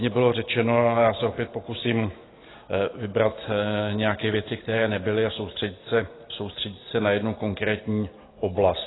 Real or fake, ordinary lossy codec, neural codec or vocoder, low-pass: real; AAC, 16 kbps; none; 7.2 kHz